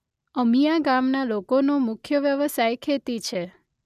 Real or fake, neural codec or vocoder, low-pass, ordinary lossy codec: real; none; 14.4 kHz; none